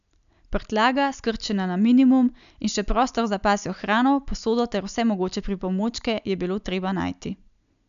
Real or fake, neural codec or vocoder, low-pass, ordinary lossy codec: real; none; 7.2 kHz; none